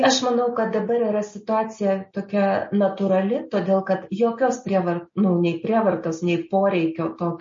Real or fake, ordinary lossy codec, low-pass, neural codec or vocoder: real; MP3, 32 kbps; 7.2 kHz; none